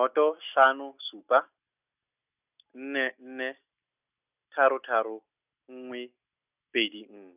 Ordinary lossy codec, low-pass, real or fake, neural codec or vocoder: none; 3.6 kHz; real; none